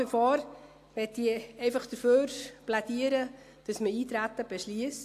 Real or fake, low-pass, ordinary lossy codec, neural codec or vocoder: real; 14.4 kHz; AAC, 64 kbps; none